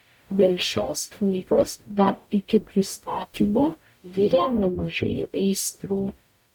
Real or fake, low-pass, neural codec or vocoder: fake; 19.8 kHz; codec, 44.1 kHz, 0.9 kbps, DAC